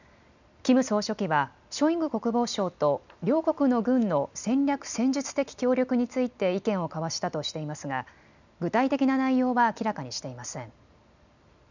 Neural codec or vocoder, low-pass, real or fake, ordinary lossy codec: none; 7.2 kHz; real; none